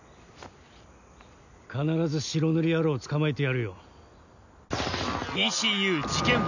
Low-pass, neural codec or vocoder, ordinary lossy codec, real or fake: 7.2 kHz; none; none; real